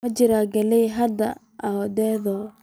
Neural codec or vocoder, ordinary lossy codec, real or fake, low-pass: none; none; real; none